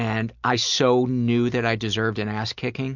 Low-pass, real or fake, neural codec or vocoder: 7.2 kHz; real; none